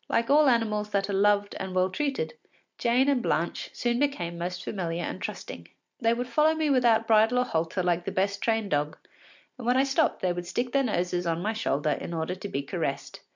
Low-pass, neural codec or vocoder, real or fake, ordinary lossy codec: 7.2 kHz; none; real; MP3, 64 kbps